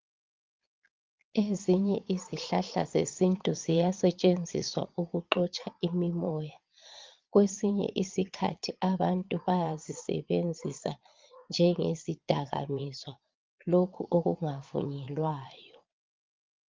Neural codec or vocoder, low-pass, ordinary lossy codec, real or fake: vocoder, 22.05 kHz, 80 mel bands, Vocos; 7.2 kHz; Opus, 24 kbps; fake